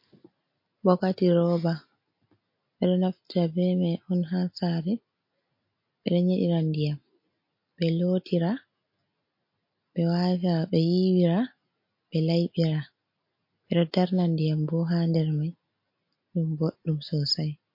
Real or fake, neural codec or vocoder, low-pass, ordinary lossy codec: real; none; 5.4 kHz; MP3, 32 kbps